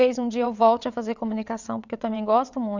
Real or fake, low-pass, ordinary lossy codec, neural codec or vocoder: fake; 7.2 kHz; none; vocoder, 22.05 kHz, 80 mel bands, WaveNeXt